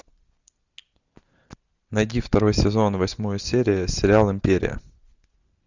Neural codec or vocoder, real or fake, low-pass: none; real; 7.2 kHz